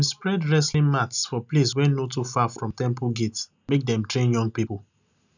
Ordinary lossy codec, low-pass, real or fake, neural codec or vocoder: none; 7.2 kHz; real; none